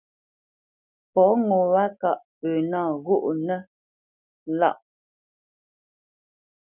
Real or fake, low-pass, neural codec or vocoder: real; 3.6 kHz; none